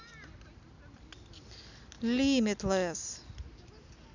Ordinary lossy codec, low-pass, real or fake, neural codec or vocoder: none; 7.2 kHz; real; none